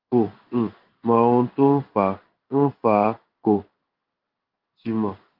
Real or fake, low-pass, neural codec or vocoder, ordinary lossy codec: fake; 5.4 kHz; codec, 16 kHz in and 24 kHz out, 1 kbps, XY-Tokenizer; Opus, 32 kbps